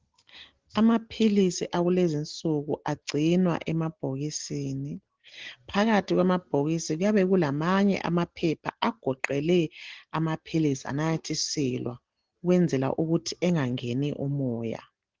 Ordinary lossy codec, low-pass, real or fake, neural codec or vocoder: Opus, 16 kbps; 7.2 kHz; real; none